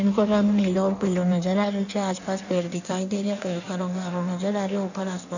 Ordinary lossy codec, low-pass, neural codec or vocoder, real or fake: none; 7.2 kHz; codec, 16 kHz in and 24 kHz out, 1.1 kbps, FireRedTTS-2 codec; fake